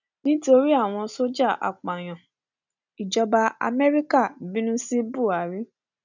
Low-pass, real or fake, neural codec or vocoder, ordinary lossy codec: 7.2 kHz; real; none; none